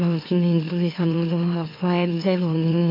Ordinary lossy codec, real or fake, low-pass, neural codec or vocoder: MP3, 32 kbps; fake; 5.4 kHz; autoencoder, 44.1 kHz, a latent of 192 numbers a frame, MeloTTS